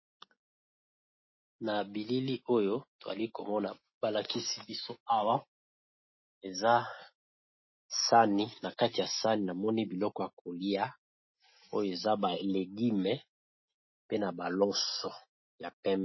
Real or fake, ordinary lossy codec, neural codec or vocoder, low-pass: real; MP3, 24 kbps; none; 7.2 kHz